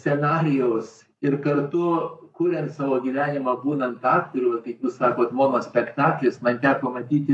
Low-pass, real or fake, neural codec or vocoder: 10.8 kHz; fake; codec, 44.1 kHz, 7.8 kbps, Pupu-Codec